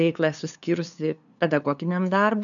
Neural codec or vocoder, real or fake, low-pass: codec, 16 kHz, 2 kbps, FunCodec, trained on LibriTTS, 25 frames a second; fake; 7.2 kHz